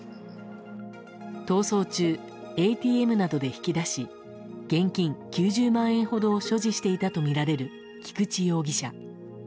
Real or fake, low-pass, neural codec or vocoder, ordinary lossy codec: real; none; none; none